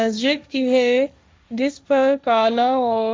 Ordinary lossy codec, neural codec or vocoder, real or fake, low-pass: none; codec, 16 kHz, 1.1 kbps, Voila-Tokenizer; fake; none